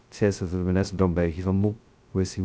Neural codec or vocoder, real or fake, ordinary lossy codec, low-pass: codec, 16 kHz, 0.2 kbps, FocalCodec; fake; none; none